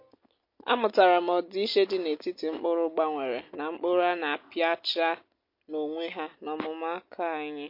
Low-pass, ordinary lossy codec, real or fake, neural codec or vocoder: 5.4 kHz; MP3, 32 kbps; real; none